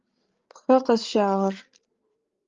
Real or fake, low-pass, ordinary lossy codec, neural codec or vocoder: fake; 7.2 kHz; Opus, 32 kbps; codec, 16 kHz, 16 kbps, FreqCodec, larger model